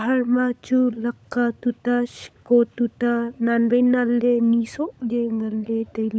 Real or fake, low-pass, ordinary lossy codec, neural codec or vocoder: fake; none; none; codec, 16 kHz, 16 kbps, FunCodec, trained on LibriTTS, 50 frames a second